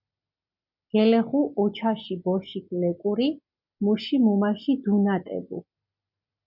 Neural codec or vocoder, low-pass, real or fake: none; 5.4 kHz; real